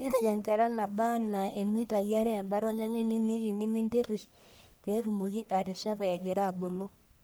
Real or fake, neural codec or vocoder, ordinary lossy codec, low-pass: fake; codec, 44.1 kHz, 1.7 kbps, Pupu-Codec; none; none